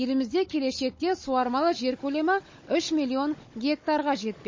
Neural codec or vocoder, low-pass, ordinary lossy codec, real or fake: codec, 16 kHz, 16 kbps, FunCodec, trained on Chinese and English, 50 frames a second; 7.2 kHz; MP3, 32 kbps; fake